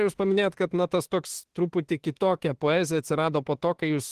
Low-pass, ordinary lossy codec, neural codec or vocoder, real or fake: 14.4 kHz; Opus, 16 kbps; autoencoder, 48 kHz, 32 numbers a frame, DAC-VAE, trained on Japanese speech; fake